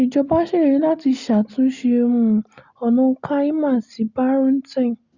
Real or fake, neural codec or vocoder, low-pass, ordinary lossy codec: real; none; 7.2 kHz; Opus, 64 kbps